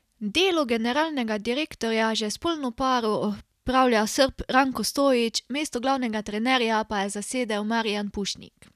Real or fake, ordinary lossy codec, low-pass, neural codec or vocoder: real; none; 14.4 kHz; none